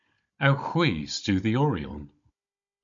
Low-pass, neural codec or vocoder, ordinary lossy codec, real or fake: 7.2 kHz; codec, 16 kHz, 16 kbps, FunCodec, trained on Chinese and English, 50 frames a second; MP3, 48 kbps; fake